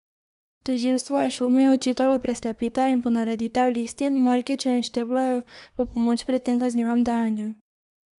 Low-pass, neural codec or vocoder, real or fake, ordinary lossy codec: 10.8 kHz; codec, 24 kHz, 1 kbps, SNAC; fake; none